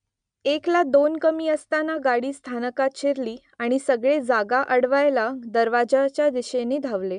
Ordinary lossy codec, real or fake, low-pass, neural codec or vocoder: none; real; 9.9 kHz; none